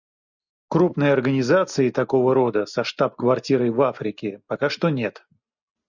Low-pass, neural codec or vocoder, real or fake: 7.2 kHz; none; real